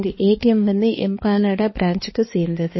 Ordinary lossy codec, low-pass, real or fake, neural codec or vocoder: MP3, 24 kbps; 7.2 kHz; fake; codec, 16 kHz, 2 kbps, X-Codec, HuBERT features, trained on balanced general audio